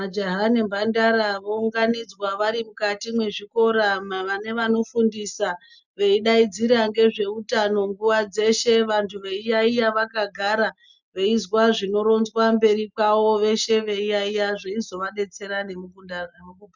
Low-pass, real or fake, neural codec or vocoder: 7.2 kHz; real; none